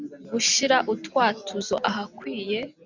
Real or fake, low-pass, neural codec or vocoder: real; 7.2 kHz; none